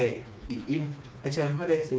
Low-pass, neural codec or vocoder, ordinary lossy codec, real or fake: none; codec, 16 kHz, 2 kbps, FreqCodec, smaller model; none; fake